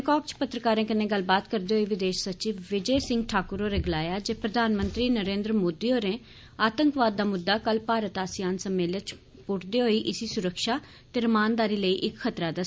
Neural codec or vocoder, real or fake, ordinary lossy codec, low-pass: none; real; none; none